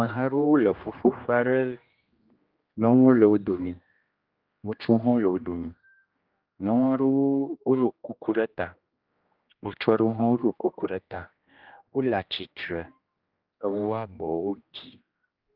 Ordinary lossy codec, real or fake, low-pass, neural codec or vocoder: Opus, 24 kbps; fake; 5.4 kHz; codec, 16 kHz, 1 kbps, X-Codec, HuBERT features, trained on general audio